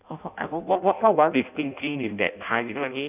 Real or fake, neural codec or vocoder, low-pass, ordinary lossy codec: fake; codec, 16 kHz in and 24 kHz out, 0.6 kbps, FireRedTTS-2 codec; 3.6 kHz; none